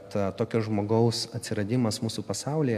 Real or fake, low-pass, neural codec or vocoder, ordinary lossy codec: real; 14.4 kHz; none; AAC, 96 kbps